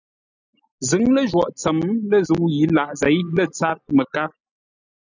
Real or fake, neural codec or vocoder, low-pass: real; none; 7.2 kHz